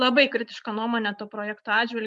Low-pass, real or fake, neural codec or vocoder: 10.8 kHz; real; none